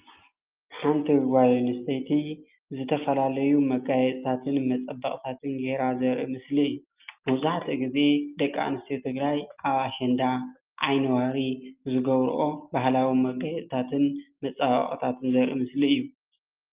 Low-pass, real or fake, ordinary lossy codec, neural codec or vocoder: 3.6 kHz; real; Opus, 32 kbps; none